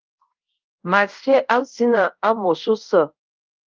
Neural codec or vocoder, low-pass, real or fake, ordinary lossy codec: codec, 24 kHz, 0.5 kbps, DualCodec; 7.2 kHz; fake; Opus, 24 kbps